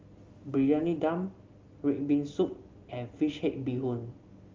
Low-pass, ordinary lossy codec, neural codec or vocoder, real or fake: 7.2 kHz; Opus, 32 kbps; none; real